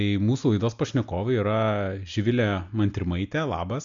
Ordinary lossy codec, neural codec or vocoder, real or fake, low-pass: MP3, 64 kbps; none; real; 7.2 kHz